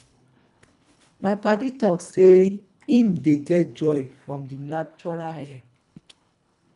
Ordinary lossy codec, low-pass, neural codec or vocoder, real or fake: none; 10.8 kHz; codec, 24 kHz, 1.5 kbps, HILCodec; fake